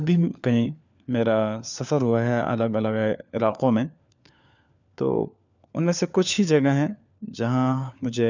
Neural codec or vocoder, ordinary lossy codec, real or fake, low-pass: codec, 16 kHz, 4 kbps, FunCodec, trained on LibriTTS, 50 frames a second; none; fake; 7.2 kHz